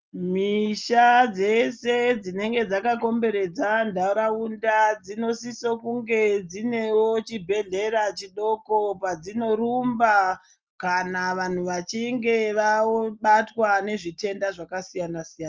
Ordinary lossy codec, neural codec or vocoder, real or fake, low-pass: Opus, 24 kbps; none; real; 7.2 kHz